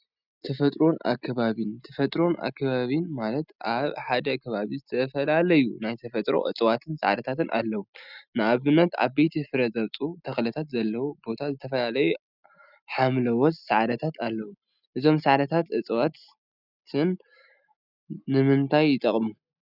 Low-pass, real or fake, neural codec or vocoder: 5.4 kHz; real; none